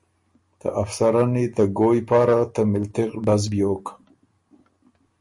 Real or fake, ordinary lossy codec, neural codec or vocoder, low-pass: real; MP3, 48 kbps; none; 10.8 kHz